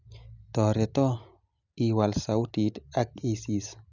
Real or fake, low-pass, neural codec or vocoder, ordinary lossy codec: real; 7.2 kHz; none; none